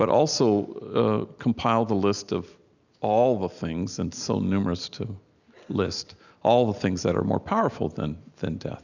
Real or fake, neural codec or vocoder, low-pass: real; none; 7.2 kHz